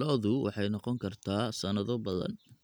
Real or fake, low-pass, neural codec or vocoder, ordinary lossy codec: real; none; none; none